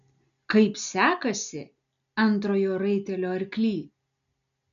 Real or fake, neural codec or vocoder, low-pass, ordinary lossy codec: real; none; 7.2 kHz; MP3, 96 kbps